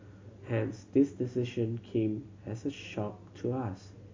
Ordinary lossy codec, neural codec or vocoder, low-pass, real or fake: MP3, 64 kbps; none; 7.2 kHz; real